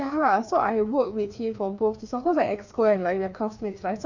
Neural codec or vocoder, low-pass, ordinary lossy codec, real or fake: codec, 16 kHz, 2 kbps, FreqCodec, larger model; 7.2 kHz; none; fake